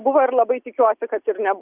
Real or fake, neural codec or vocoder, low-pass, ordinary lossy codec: real; none; 3.6 kHz; Opus, 64 kbps